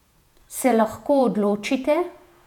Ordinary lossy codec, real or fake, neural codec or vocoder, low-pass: none; fake; vocoder, 48 kHz, 128 mel bands, Vocos; 19.8 kHz